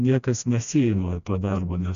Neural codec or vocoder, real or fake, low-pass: codec, 16 kHz, 1 kbps, FreqCodec, smaller model; fake; 7.2 kHz